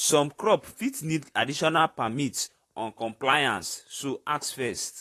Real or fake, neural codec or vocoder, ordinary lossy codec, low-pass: fake; vocoder, 44.1 kHz, 128 mel bands, Pupu-Vocoder; AAC, 48 kbps; 14.4 kHz